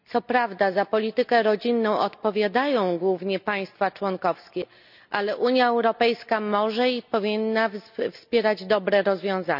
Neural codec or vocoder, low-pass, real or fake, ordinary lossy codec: none; 5.4 kHz; real; none